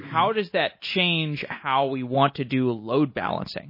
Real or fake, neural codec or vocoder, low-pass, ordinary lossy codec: real; none; 5.4 kHz; MP3, 24 kbps